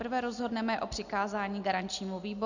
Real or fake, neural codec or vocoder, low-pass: real; none; 7.2 kHz